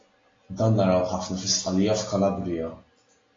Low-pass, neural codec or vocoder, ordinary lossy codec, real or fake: 7.2 kHz; none; AAC, 32 kbps; real